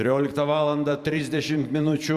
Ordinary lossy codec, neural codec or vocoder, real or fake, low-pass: Opus, 64 kbps; vocoder, 44.1 kHz, 128 mel bands every 512 samples, BigVGAN v2; fake; 14.4 kHz